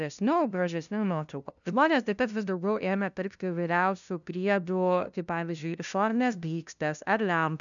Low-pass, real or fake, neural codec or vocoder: 7.2 kHz; fake; codec, 16 kHz, 0.5 kbps, FunCodec, trained on LibriTTS, 25 frames a second